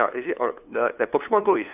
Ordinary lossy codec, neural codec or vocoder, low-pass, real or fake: none; codec, 16 kHz, 2 kbps, FunCodec, trained on LibriTTS, 25 frames a second; 3.6 kHz; fake